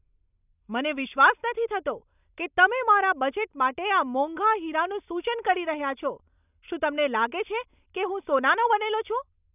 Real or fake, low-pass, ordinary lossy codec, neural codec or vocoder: real; 3.6 kHz; none; none